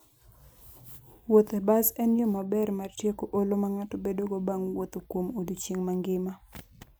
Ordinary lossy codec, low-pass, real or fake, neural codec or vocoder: none; none; real; none